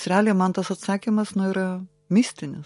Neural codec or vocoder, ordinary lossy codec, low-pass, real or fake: none; MP3, 48 kbps; 14.4 kHz; real